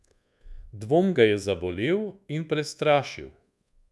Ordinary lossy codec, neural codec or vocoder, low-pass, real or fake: none; codec, 24 kHz, 1.2 kbps, DualCodec; none; fake